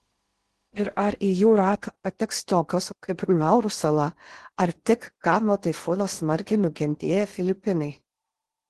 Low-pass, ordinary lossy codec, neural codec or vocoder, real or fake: 10.8 kHz; Opus, 16 kbps; codec, 16 kHz in and 24 kHz out, 0.6 kbps, FocalCodec, streaming, 2048 codes; fake